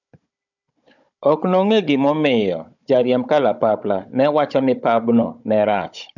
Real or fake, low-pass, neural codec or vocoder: fake; 7.2 kHz; codec, 16 kHz, 16 kbps, FunCodec, trained on Chinese and English, 50 frames a second